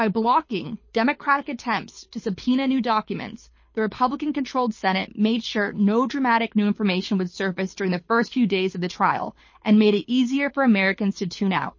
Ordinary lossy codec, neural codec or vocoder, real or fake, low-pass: MP3, 32 kbps; codec, 24 kHz, 6 kbps, HILCodec; fake; 7.2 kHz